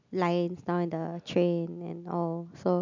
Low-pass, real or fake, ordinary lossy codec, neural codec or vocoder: 7.2 kHz; real; MP3, 64 kbps; none